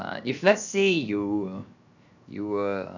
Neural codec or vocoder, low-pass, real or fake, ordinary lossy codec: codec, 16 kHz, 0.7 kbps, FocalCodec; 7.2 kHz; fake; none